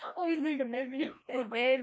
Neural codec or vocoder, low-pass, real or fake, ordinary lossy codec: codec, 16 kHz, 1 kbps, FreqCodec, larger model; none; fake; none